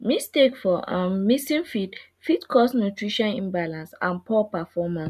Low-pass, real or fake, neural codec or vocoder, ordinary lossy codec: 14.4 kHz; real; none; none